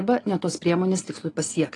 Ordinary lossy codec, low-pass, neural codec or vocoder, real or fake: AAC, 32 kbps; 10.8 kHz; none; real